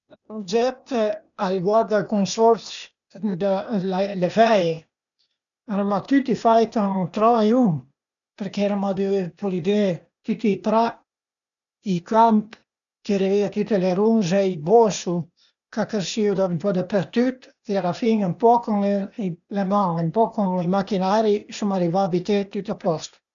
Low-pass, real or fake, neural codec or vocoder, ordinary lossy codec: 7.2 kHz; fake; codec, 16 kHz, 0.8 kbps, ZipCodec; none